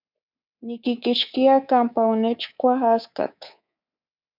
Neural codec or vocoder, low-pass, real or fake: codec, 24 kHz, 3.1 kbps, DualCodec; 5.4 kHz; fake